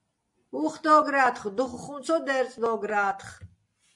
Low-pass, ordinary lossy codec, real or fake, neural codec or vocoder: 10.8 kHz; MP3, 48 kbps; real; none